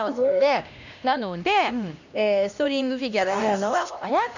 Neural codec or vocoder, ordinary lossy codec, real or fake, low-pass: codec, 16 kHz, 1 kbps, X-Codec, HuBERT features, trained on LibriSpeech; none; fake; 7.2 kHz